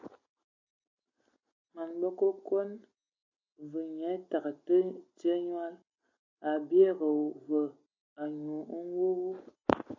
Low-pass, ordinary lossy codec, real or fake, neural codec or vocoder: 7.2 kHz; AAC, 48 kbps; real; none